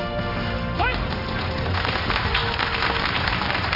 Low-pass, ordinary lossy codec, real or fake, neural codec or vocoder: 5.4 kHz; none; fake; codec, 16 kHz in and 24 kHz out, 1 kbps, XY-Tokenizer